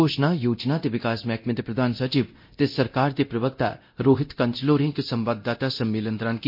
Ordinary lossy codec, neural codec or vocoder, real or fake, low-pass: MP3, 32 kbps; codec, 24 kHz, 0.9 kbps, DualCodec; fake; 5.4 kHz